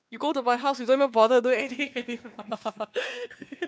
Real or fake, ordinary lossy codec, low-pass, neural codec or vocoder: fake; none; none; codec, 16 kHz, 2 kbps, X-Codec, WavLM features, trained on Multilingual LibriSpeech